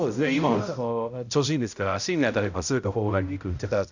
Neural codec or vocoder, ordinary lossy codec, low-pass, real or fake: codec, 16 kHz, 0.5 kbps, X-Codec, HuBERT features, trained on balanced general audio; none; 7.2 kHz; fake